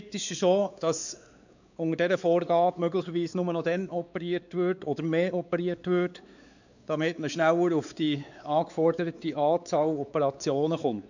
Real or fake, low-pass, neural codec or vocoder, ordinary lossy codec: fake; 7.2 kHz; codec, 16 kHz, 4 kbps, X-Codec, WavLM features, trained on Multilingual LibriSpeech; none